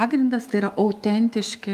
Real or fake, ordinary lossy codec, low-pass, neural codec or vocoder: fake; Opus, 32 kbps; 14.4 kHz; autoencoder, 48 kHz, 128 numbers a frame, DAC-VAE, trained on Japanese speech